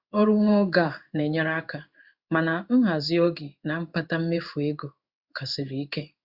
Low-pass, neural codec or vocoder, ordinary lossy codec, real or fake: 5.4 kHz; codec, 16 kHz in and 24 kHz out, 1 kbps, XY-Tokenizer; Opus, 64 kbps; fake